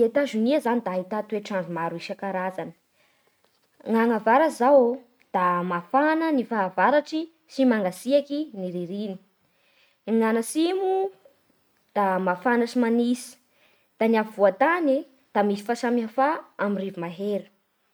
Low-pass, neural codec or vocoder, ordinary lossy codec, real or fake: none; none; none; real